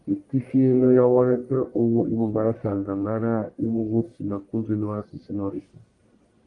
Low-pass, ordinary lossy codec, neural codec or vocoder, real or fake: 10.8 kHz; Opus, 32 kbps; codec, 44.1 kHz, 1.7 kbps, Pupu-Codec; fake